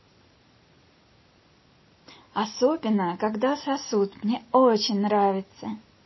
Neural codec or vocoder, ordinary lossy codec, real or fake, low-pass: none; MP3, 24 kbps; real; 7.2 kHz